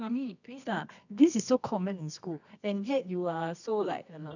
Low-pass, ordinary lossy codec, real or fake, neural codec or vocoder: 7.2 kHz; none; fake; codec, 24 kHz, 0.9 kbps, WavTokenizer, medium music audio release